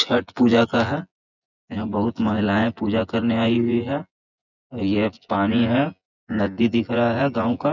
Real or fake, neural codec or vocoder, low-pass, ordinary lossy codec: fake; vocoder, 24 kHz, 100 mel bands, Vocos; 7.2 kHz; none